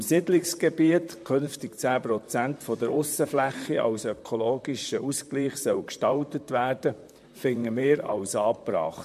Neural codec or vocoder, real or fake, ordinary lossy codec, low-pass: vocoder, 44.1 kHz, 128 mel bands, Pupu-Vocoder; fake; MP3, 64 kbps; 14.4 kHz